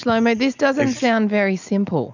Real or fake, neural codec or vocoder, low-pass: real; none; 7.2 kHz